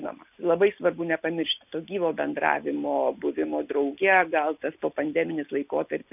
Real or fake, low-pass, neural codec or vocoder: real; 3.6 kHz; none